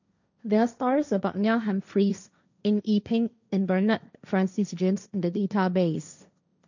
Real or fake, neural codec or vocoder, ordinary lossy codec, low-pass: fake; codec, 16 kHz, 1.1 kbps, Voila-Tokenizer; none; 7.2 kHz